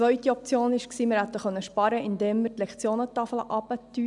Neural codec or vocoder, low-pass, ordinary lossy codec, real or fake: none; 10.8 kHz; none; real